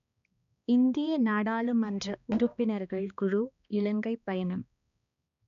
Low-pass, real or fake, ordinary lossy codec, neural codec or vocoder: 7.2 kHz; fake; none; codec, 16 kHz, 2 kbps, X-Codec, HuBERT features, trained on balanced general audio